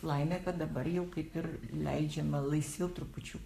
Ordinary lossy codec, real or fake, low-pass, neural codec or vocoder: AAC, 96 kbps; fake; 14.4 kHz; codec, 44.1 kHz, 7.8 kbps, Pupu-Codec